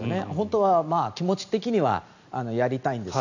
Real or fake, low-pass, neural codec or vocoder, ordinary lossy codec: real; 7.2 kHz; none; none